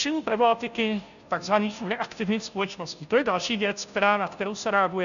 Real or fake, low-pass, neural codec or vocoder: fake; 7.2 kHz; codec, 16 kHz, 0.5 kbps, FunCodec, trained on Chinese and English, 25 frames a second